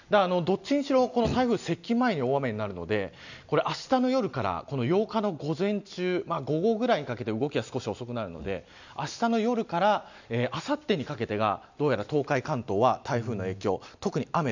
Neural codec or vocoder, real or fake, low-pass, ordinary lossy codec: none; real; 7.2 kHz; none